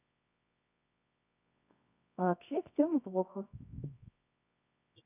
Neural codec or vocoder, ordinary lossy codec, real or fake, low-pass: codec, 24 kHz, 0.9 kbps, WavTokenizer, medium music audio release; none; fake; 3.6 kHz